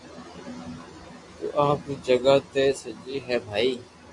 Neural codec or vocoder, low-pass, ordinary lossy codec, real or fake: none; 10.8 kHz; AAC, 64 kbps; real